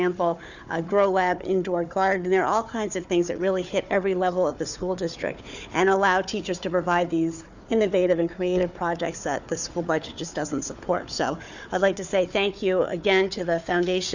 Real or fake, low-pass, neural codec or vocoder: fake; 7.2 kHz; codec, 16 kHz, 4 kbps, FunCodec, trained on Chinese and English, 50 frames a second